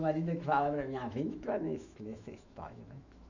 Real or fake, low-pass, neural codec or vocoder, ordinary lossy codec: fake; 7.2 kHz; autoencoder, 48 kHz, 128 numbers a frame, DAC-VAE, trained on Japanese speech; none